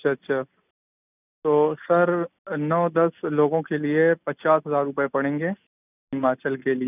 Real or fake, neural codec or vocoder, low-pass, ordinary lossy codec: real; none; 3.6 kHz; none